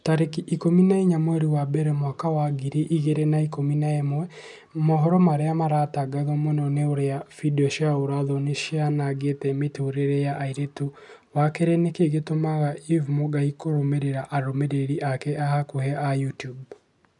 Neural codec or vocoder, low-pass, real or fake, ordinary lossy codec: none; 10.8 kHz; real; none